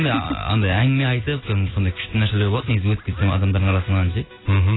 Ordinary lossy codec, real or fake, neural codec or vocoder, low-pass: AAC, 16 kbps; real; none; 7.2 kHz